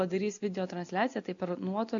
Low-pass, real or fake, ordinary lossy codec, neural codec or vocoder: 7.2 kHz; real; AAC, 64 kbps; none